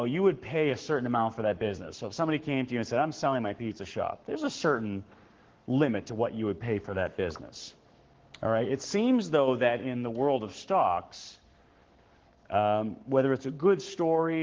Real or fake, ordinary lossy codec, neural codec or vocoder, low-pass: fake; Opus, 16 kbps; codec, 44.1 kHz, 7.8 kbps, Pupu-Codec; 7.2 kHz